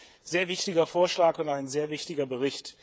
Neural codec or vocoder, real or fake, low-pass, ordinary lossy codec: codec, 16 kHz, 8 kbps, FreqCodec, smaller model; fake; none; none